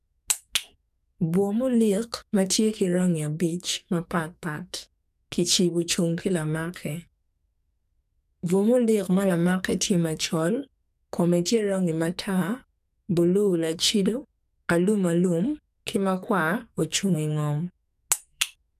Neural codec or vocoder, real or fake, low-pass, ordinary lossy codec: codec, 44.1 kHz, 2.6 kbps, SNAC; fake; 14.4 kHz; none